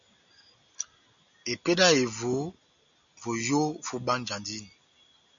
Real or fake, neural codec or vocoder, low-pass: real; none; 7.2 kHz